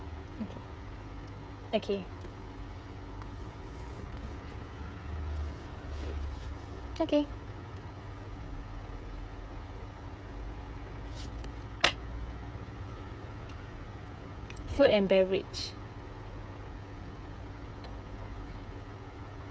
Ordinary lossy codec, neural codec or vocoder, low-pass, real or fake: none; codec, 16 kHz, 16 kbps, FreqCodec, smaller model; none; fake